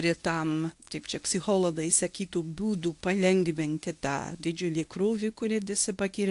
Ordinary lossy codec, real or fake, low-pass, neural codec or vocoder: AAC, 64 kbps; fake; 10.8 kHz; codec, 24 kHz, 0.9 kbps, WavTokenizer, medium speech release version 1